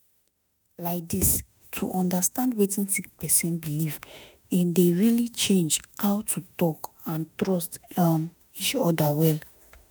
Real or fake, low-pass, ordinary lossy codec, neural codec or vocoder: fake; none; none; autoencoder, 48 kHz, 32 numbers a frame, DAC-VAE, trained on Japanese speech